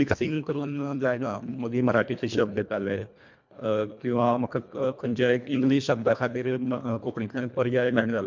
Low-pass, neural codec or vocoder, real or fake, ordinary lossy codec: 7.2 kHz; codec, 24 kHz, 1.5 kbps, HILCodec; fake; MP3, 64 kbps